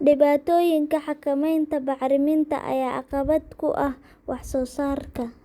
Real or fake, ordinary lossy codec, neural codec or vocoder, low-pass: real; Opus, 64 kbps; none; 19.8 kHz